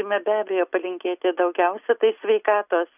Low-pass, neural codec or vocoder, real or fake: 3.6 kHz; none; real